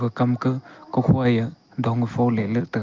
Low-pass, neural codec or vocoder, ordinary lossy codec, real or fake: 7.2 kHz; none; Opus, 24 kbps; real